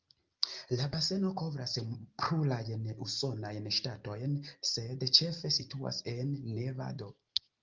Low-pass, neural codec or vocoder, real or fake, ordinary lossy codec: 7.2 kHz; none; real; Opus, 24 kbps